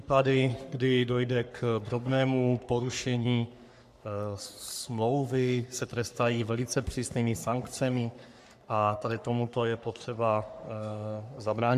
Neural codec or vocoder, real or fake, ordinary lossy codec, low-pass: codec, 44.1 kHz, 3.4 kbps, Pupu-Codec; fake; MP3, 96 kbps; 14.4 kHz